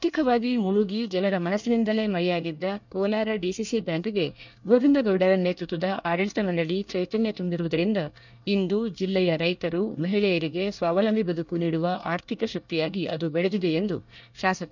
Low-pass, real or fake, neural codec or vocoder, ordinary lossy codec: 7.2 kHz; fake; codec, 24 kHz, 1 kbps, SNAC; none